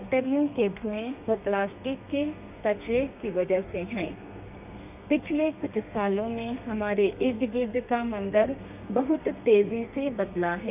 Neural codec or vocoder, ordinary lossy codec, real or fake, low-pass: codec, 32 kHz, 1.9 kbps, SNAC; none; fake; 3.6 kHz